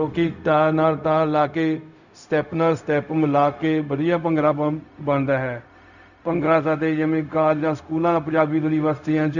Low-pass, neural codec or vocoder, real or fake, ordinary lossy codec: 7.2 kHz; codec, 16 kHz, 0.4 kbps, LongCat-Audio-Codec; fake; none